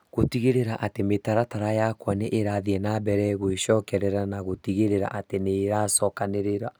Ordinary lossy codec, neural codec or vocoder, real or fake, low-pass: none; none; real; none